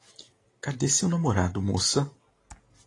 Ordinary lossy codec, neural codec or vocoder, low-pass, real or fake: AAC, 32 kbps; none; 10.8 kHz; real